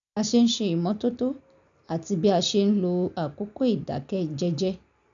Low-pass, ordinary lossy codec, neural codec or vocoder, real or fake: 7.2 kHz; none; none; real